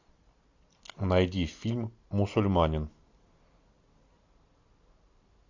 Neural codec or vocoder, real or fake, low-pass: none; real; 7.2 kHz